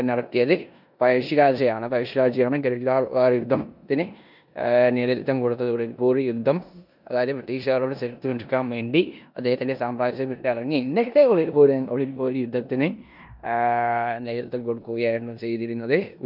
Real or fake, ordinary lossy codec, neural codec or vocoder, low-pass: fake; none; codec, 16 kHz in and 24 kHz out, 0.9 kbps, LongCat-Audio-Codec, four codebook decoder; 5.4 kHz